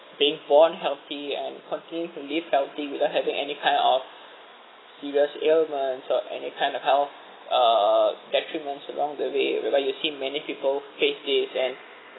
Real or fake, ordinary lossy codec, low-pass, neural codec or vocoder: real; AAC, 16 kbps; 7.2 kHz; none